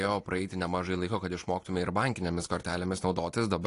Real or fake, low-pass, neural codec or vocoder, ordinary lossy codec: real; 10.8 kHz; none; AAC, 48 kbps